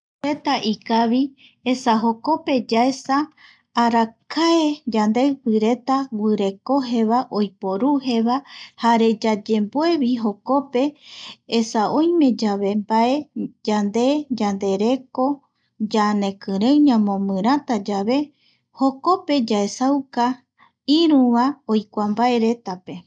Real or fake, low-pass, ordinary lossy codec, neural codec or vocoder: real; 9.9 kHz; none; none